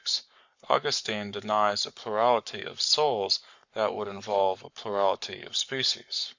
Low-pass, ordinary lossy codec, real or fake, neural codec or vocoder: 7.2 kHz; Opus, 64 kbps; fake; codec, 44.1 kHz, 7.8 kbps, Pupu-Codec